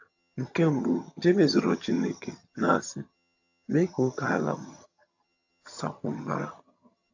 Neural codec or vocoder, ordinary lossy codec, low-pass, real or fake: vocoder, 22.05 kHz, 80 mel bands, HiFi-GAN; AAC, 48 kbps; 7.2 kHz; fake